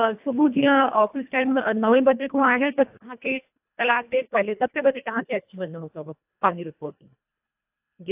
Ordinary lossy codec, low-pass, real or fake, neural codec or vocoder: AAC, 32 kbps; 3.6 kHz; fake; codec, 24 kHz, 1.5 kbps, HILCodec